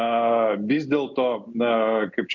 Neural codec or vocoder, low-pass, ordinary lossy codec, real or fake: none; 7.2 kHz; MP3, 64 kbps; real